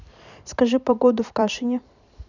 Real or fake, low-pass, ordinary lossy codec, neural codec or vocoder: fake; 7.2 kHz; none; vocoder, 44.1 kHz, 128 mel bands every 256 samples, BigVGAN v2